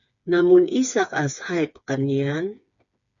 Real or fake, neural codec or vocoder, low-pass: fake; codec, 16 kHz, 4 kbps, FreqCodec, smaller model; 7.2 kHz